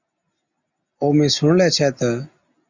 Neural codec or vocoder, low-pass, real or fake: none; 7.2 kHz; real